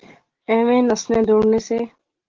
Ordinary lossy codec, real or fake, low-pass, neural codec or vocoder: Opus, 16 kbps; real; 7.2 kHz; none